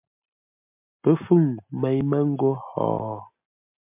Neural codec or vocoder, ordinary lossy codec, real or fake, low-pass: none; MP3, 32 kbps; real; 3.6 kHz